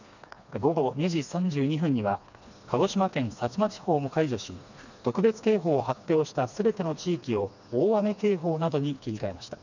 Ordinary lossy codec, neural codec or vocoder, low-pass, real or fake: none; codec, 16 kHz, 2 kbps, FreqCodec, smaller model; 7.2 kHz; fake